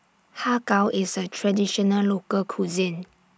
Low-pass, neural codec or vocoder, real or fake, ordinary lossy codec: none; none; real; none